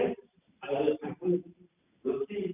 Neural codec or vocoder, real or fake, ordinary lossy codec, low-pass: vocoder, 44.1 kHz, 128 mel bands every 256 samples, BigVGAN v2; fake; none; 3.6 kHz